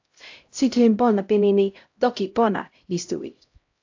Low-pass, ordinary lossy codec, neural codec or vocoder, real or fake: 7.2 kHz; AAC, 48 kbps; codec, 16 kHz, 0.5 kbps, X-Codec, HuBERT features, trained on LibriSpeech; fake